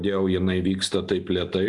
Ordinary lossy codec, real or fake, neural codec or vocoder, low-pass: AAC, 64 kbps; real; none; 10.8 kHz